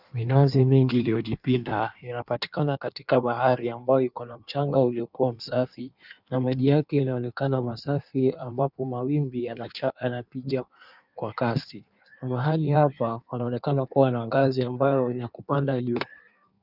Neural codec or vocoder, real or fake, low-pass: codec, 16 kHz in and 24 kHz out, 1.1 kbps, FireRedTTS-2 codec; fake; 5.4 kHz